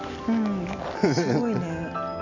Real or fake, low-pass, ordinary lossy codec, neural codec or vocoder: real; 7.2 kHz; none; none